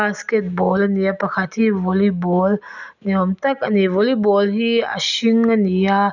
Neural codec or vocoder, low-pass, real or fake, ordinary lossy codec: none; 7.2 kHz; real; none